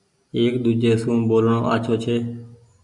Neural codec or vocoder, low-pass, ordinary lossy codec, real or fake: none; 10.8 kHz; MP3, 96 kbps; real